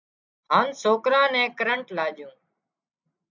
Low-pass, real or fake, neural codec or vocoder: 7.2 kHz; real; none